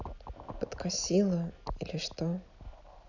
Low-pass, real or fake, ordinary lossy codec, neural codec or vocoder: 7.2 kHz; real; none; none